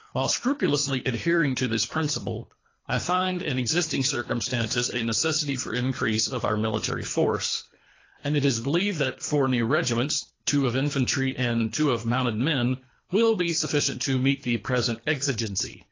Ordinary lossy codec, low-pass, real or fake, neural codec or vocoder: AAC, 32 kbps; 7.2 kHz; fake; codec, 24 kHz, 3 kbps, HILCodec